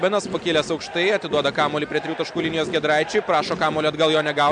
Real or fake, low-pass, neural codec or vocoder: real; 9.9 kHz; none